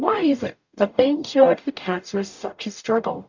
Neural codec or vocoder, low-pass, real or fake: codec, 44.1 kHz, 0.9 kbps, DAC; 7.2 kHz; fake